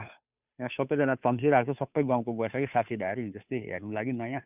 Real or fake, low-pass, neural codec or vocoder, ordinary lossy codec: fake; 3.6 kHz; codec, 16 kHz, 2 kbps, FunCodec, trained on Chinese and English, 25 frames a second; none